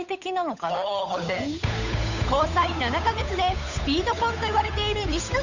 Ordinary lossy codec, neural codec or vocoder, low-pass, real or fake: none; codec, 16 kHz, 8 kbps, FunCodec, trained on Chinese and English, 25 frames a second; 7.2 kHz; fake